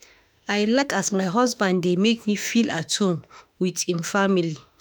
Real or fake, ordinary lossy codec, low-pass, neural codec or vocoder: fake; none; none; autoencoder, 48 kHz, 32 numbers a frame, DAC-VAE, trained on Japanese speech